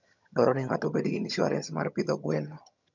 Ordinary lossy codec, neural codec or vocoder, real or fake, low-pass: none; vocoder, 22.05 kHz, 80 mel bands, HiFi-GAN; fake; 7.2 kHz